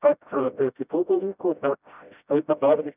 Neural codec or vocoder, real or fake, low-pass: codec, 16 kHz, 0.5 kbps, FreqCodec, smaller model; fake; 3.6 kHz